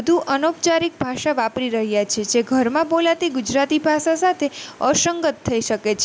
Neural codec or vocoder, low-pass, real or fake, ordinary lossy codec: none; none; real; none